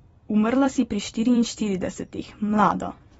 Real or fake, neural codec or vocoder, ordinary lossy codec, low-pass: fake; vocoder, 44.1 kHz, 128 mel bands every 256 samples, BigVGAN v2; AAC, 24 kbps; 19.8 kHz